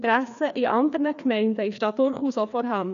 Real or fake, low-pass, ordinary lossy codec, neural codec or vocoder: fake; 7.2 kHz; none; codec, 16 kHz, 2 kbps, FreqCodec, larger model